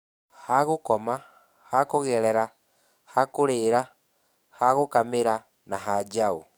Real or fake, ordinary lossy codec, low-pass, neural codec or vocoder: fake; none; none; codec, 44.1 kHz, 7.8 kbps, DAC